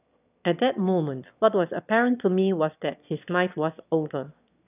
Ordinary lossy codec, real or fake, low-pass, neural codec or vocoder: none; fake; 3.6 kHz; autoencoder, 22.05 kHz, a latent of 192 numbers a frame, VITS, trained on one speaker